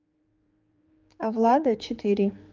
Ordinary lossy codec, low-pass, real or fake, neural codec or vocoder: Opus, 24 kbps; 7.2 kHz; fake; codec, 16 kHz, 4 kbps, X-Codec, HuBERT features, trained on general audio